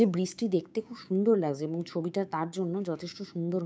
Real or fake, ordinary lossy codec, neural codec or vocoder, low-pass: fake; none; codec, 16 kHz, 6 kbps, DAC; none